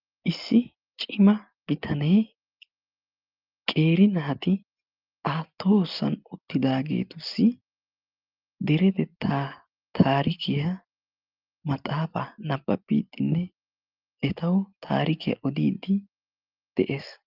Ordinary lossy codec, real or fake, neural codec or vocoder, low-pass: Opus, 24 kbps; real; none; 5.4 kHz